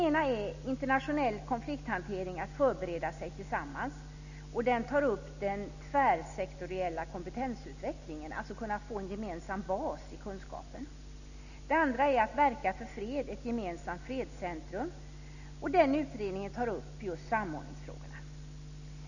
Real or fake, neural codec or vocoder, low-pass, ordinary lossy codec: real; none; 7.2 kHz; none